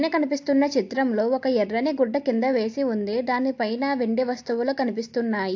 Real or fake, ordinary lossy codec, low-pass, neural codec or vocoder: real; AAC, 48 kbps; 7.2 kHz; none